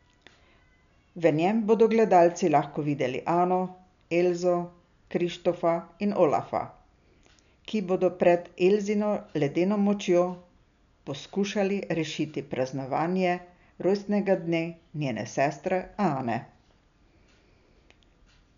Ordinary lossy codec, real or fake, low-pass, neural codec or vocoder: none; real; 7.2 kHz; none